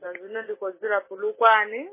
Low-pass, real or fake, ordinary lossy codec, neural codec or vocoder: 3.6 kHz; real; MP3, 16 kbps; none